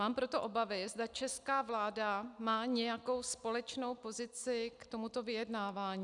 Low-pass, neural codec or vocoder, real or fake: 10.8 kHz; none; real